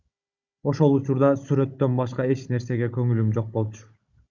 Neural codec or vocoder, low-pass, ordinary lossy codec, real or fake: codec, 16 kHz, 16 kbps, FunCodec, trained on Chinese and English, 50 frames a second; 7.2 kHz; Opus, 64 kbps; fake